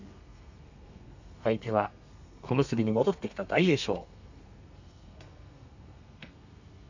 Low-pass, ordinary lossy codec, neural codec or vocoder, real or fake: 7.2 kHz; none; codec, 32 kHz, 1.9 kbps, SNAC; fake